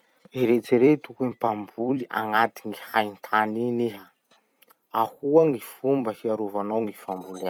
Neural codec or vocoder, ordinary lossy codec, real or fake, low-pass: vocoder, 44.1 kHz, 128 mel bands every 256 samples, BigVGAN v2; none; fake; 19.8 kHz